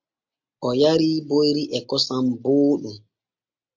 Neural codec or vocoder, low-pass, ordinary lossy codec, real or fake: none; 7.2 kHz; MP3, 48 kbps; real